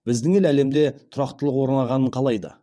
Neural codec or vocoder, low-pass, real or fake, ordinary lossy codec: vocoder, 22.05 kHz, 80 mel bands, WaveNeXt; none; fake; none